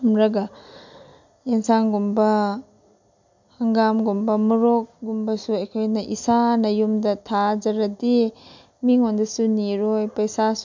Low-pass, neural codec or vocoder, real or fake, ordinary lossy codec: 7.2 kHz; none; real; MP3, 64 kbps